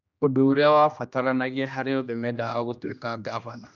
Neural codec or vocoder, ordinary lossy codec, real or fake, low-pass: codec, 16 kHz, 1 kbps, X-Codec, HuBERT features, trained on general audio; none; fake; 7.2 kHz